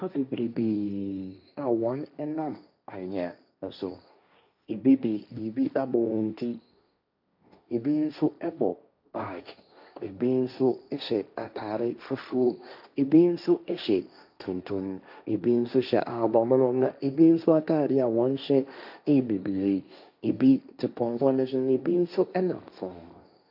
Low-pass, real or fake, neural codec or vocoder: 5.4 kHz; fake; codec, 16 kHz, 1.1 kbps, Voila-Tokenizer